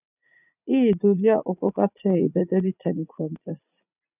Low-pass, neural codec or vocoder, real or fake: 3.6 kHz; vocoder, 44.1 kHz, 80 mel bands, Vocos; fake